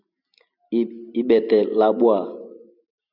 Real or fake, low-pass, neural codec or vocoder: real; 5.4 kHz; none